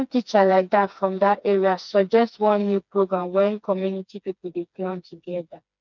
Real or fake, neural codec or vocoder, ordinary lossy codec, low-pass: fake; codec, 16 kHz, 2 kbps, FreqCodec, smaller model; none; 7.2 kHz